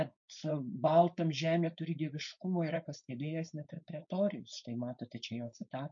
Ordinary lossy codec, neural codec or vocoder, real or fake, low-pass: MP3, 48 kbps; codec, 16 kHz, 4.8 kbps, FACodec; fake; 7.2 kHz